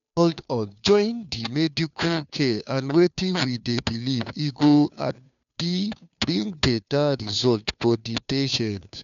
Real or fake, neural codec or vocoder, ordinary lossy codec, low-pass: fake; codec, 16 kHz, 2 kbps, FunCodec, trained on Chinese and English, 25 frames a second; none; 7.2 kHz